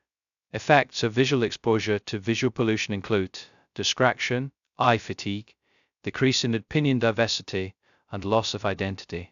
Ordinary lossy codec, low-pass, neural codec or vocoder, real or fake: none; 7.2 kHz; codec, 16 kHz, 0.2 kbps, FocalCodec; fake